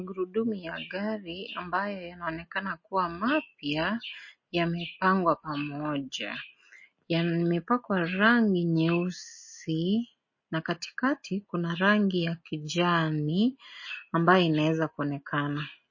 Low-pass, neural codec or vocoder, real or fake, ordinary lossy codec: 7.2 kHz; none; real; MP3, 32 kbps